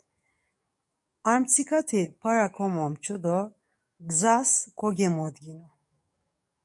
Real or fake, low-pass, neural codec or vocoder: fake; 10.8 kHz; codec, 44.1 kHz, 7.8 kbps, DAC